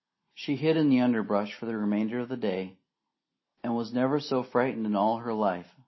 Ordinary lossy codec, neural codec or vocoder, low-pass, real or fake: MP3, 24 kbps; none; 7.2 kHz; real